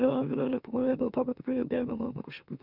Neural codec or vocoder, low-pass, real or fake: autoencoder, 44.1 kHz, a latent of 192 numbers a frame, MeloTTS; 5.4 kHz; fake